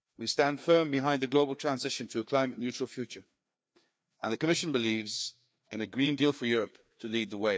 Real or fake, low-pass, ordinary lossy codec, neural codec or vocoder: fake; none; none; codec, 16 kHz, 2 kbps, FreqCodec, larger model